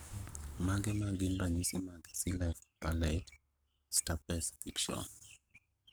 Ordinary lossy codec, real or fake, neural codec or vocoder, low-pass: none; fake; codec, 44.1 kHz, 7.8 kbps, Pupu-Codec; none